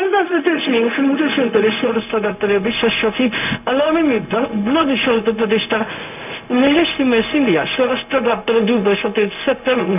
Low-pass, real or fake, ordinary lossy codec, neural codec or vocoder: 3.6 kHz; fake; none; codec, 16 kHz, 0.4 kbps, LongCat-Audio-Codec